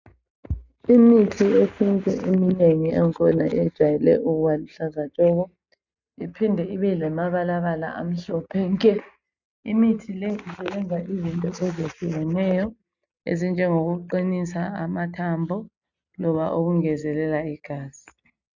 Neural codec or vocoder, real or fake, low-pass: none; real; 7.2 kHz